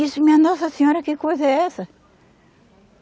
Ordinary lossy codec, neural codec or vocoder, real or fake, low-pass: none; none; real; none